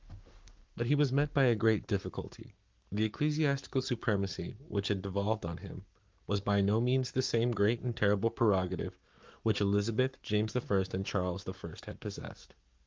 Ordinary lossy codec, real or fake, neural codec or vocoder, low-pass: Opus, 24 kbps; fake; codec, 44.1 kHz, 7.8 kbps, Pupu-Codec; 7.2 kHz